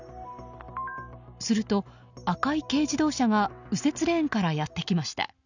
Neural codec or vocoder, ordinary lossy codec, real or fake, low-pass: none; none; real; 7.2 kHz